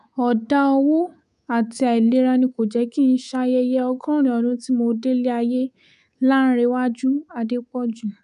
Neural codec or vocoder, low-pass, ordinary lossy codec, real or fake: codec, 24 kHz, 3.1 kbps, DualCodec; 10.8 kHz; none; fake